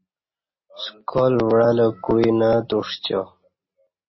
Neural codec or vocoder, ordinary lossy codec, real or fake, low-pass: none; MP3, 24 kbps; real; 7.2 kHz